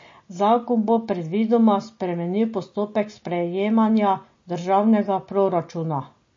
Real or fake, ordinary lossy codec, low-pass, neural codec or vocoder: real; MP3, 32 kbps; 7.2 kHz; none